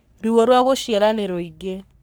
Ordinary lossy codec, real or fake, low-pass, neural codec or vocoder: none; fake; none; codec, 44.1 kHz, 3.4 kbps, Pupu-Codec